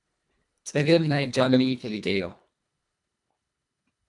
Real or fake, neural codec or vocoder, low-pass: fake; codec, 24 kHz, 1.5 kbps, HILCodec; 10.8 kHz